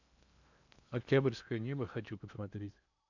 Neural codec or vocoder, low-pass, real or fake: codec, 16 kHz in and 24 kHz out, 0.8 kbps, FocalCodec, streaming, 65536 codes; 7.2 kHz; fake